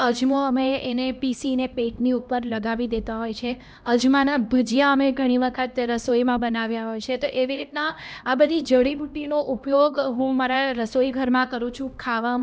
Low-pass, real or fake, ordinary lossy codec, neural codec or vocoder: none; fake; none; codec, 16 kHz, 1 kbps, X-Codec, HuBERT features, trained on LibriSpeech